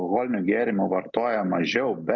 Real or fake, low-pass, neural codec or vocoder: real; 7.2 kHz; none